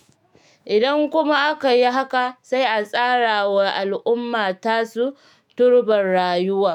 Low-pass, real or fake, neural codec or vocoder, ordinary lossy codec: 19.8 kHz; fake; autoencoder, 48 kHz, 128 numbers a frame, DAC-VAE, trained on Japanese speech; none